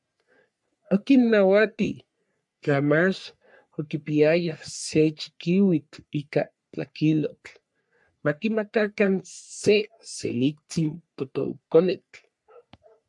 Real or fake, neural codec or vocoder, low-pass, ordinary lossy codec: fake; codec, 44.1 kHz, 3.4 kbps, Pupu-Codec; 10.8 kHz; MP3, 64 kbps